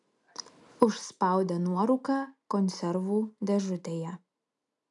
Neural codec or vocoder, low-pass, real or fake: none; 10.8 kHz; real